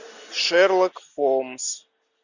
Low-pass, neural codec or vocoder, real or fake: 7.2 kHz; none; real